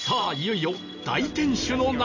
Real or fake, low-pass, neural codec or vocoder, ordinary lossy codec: real; 7.2 kHz; none; none